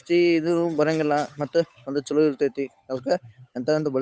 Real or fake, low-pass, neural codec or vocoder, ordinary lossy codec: fake; none; codec, 16 kHz, 8 kbps, FunCodec, trained on Chinese and English, 25 frames a second; none